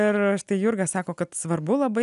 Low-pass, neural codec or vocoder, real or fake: 10.8 kHz; none; real